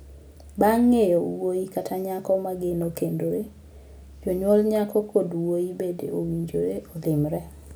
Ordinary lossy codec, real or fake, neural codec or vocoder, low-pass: none; real; none; none